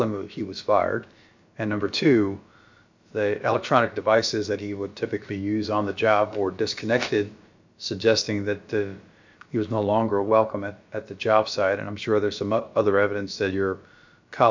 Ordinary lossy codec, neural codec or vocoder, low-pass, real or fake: MP3, 64 kbps; codec, 16 kHz, about 1 kbps, DyCAST, with the encoder's durations; 7.2 kHz; fake